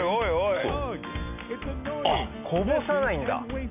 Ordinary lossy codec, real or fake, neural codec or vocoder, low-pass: none; real; none; 3.6 kHz